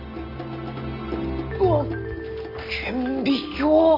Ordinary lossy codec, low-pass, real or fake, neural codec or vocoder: none; 5.4 kHz; real; none